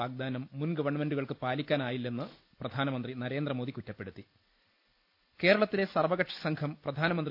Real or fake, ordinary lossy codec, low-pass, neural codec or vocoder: real; none; 5.4 kHz; none